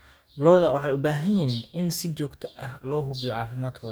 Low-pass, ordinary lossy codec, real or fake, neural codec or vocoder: none; none; fake; codec, 44.1 kHz, 2.6 kbps, DAC